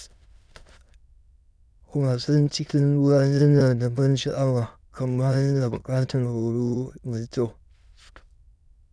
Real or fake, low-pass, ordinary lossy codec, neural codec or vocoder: fake; none; none; autoencoder, 22.05 kHz, a latent of 192 numbers a frame, VITS, trained on many speakers